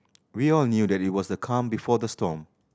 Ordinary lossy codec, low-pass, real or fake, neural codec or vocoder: none; none; real; none